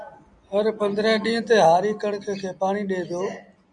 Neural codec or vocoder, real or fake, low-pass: none; real; 9.9 kHz